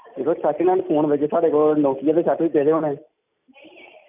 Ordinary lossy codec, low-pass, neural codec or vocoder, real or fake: none; 3.6 kHz; none; real